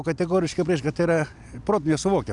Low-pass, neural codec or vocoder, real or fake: 10.8 kHz; none; real